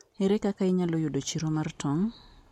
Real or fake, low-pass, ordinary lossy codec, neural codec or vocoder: real; 19.8 kHz; MP3, 64 kbps; none